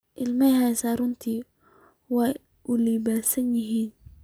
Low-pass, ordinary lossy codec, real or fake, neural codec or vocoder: none; none; real; none